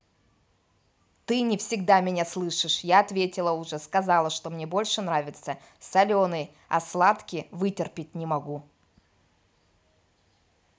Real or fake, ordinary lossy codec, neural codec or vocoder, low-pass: real; none; none; none